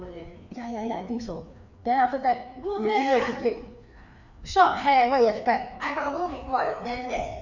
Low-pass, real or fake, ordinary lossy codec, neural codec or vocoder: 7.2 kHz; fake; none; codec, 16 kHz, 2 kbps, FreqCodec, larger model